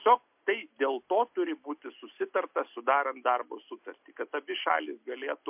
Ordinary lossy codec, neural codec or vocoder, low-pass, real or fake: MP3, 32 kbps; none; 3.6 kHz; real